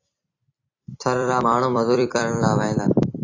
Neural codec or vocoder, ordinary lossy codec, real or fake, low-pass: vocoder, 44.1 kHz, 128 mel bands every 256 samples, BigVGAN v2; AAC, 32 kbps; fake; 7.2 kHz